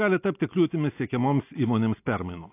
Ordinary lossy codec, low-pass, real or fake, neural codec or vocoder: AAC, 24 kbps; 3.6 kHz; real; none